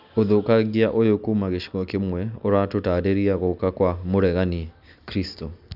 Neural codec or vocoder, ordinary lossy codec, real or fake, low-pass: none; none; real; 5.4 kHz